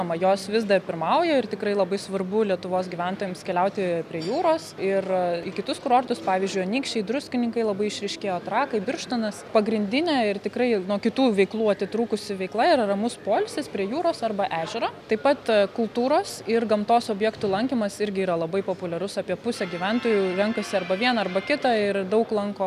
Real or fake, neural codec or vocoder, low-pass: real; none; 14.4 kHz